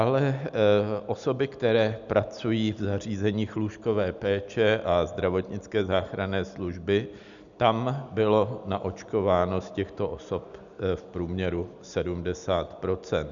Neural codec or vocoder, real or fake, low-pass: none; real; 7.2 kHz